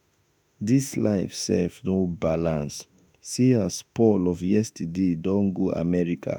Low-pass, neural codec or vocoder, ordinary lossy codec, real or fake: none; autoencoder, 48 kHz, 32 numbers a frame, DAC-VAE, trained on Japanese speech; none; fake